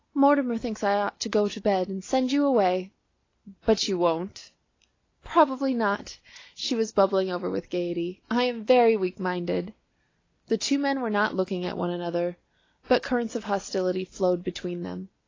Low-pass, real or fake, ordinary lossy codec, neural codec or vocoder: 7.2 kHz; real; AAC, 32 kbps; none